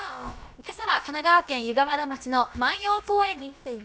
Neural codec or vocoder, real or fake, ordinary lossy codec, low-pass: codec, 16 kHz, about 1 kbps, DyCAST, with the encoder's durations; fake; none; none